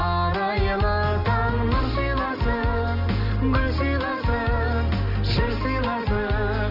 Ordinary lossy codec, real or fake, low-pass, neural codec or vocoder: none; real; 5.4 kHz; none